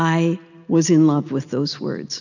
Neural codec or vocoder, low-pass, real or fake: none; 7.2 kHz; real